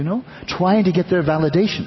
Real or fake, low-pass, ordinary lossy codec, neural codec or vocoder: real; 7.2 kHz; MP3, 24 kbps; none